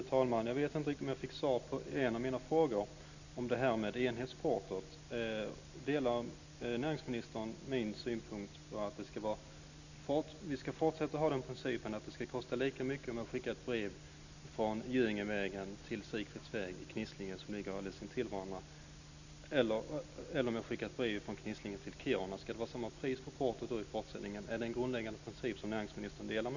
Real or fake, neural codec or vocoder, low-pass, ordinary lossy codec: real; none; 7.2 kHz; none